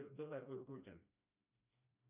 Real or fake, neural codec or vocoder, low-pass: fake; codec, 16 kHz, 1 kbps, FreqCodec, smaller model; 3.6 kHz